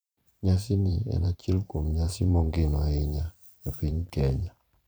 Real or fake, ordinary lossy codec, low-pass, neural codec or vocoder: fake; none; none; vocoder, 44.1 kHz, 128 mel bands every 512 samples, BigVGAN v2